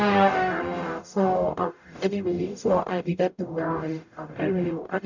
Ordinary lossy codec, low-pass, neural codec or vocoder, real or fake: none; 7.2 kHz; codec, 44.1 kHz, 0.9 kbps, DAC; fake